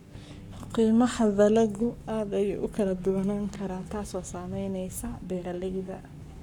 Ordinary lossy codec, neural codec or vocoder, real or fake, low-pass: none; codec, 44.1 kHz, 7.8 kbps, Pupu-Codec; fake; 19.8 kHz